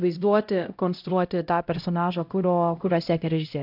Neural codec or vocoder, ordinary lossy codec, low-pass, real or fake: codec, 16 kHz, 0.5 kbps, X-Codec, HuBERT features, trained on LibriSpeech; AAC, 48 kbps; 5.4 kHz; fake